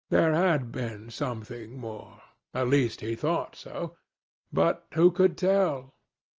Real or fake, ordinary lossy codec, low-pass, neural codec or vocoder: real; Opus, 24 kbps; 7.2 kHz; none